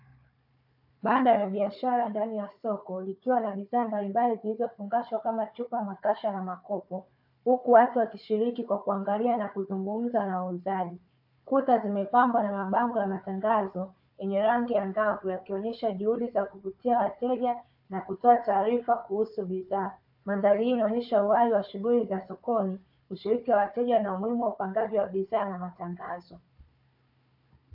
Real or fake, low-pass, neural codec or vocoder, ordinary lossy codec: fake; 5.4 kHz; codec, 16 kHz, 4 kbps, FunCodec, trained on Chinese and English, 50 frames a second; AAC, 48 kbps